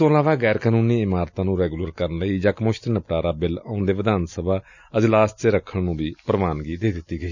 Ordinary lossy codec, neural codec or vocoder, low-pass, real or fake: none; none; 7.2 kHz; real